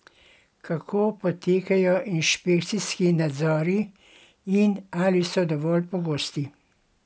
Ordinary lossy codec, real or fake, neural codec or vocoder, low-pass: none; real; none; none